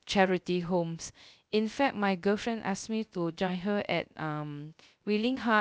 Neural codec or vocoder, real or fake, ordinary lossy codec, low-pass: codec, 16 kHz, 0.3 kbps, FocalCodec; fake; none; none